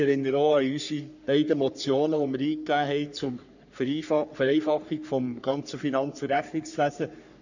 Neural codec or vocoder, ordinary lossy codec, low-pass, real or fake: codec, 44.1 kHz, 3.4 kbps, Pupu-Codec; none; 7.2 kHz; fake